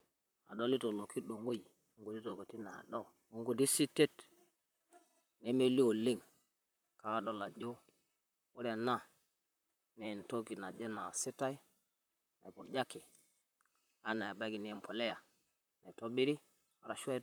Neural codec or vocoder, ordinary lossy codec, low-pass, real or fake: vocoder, 44.1 kHz, 128 mel bands, Pupu-Vocoder; none; none; fake